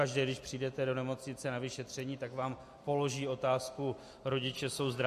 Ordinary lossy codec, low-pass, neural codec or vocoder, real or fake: MP3, 64 kbps; 14.4 kHz; none; real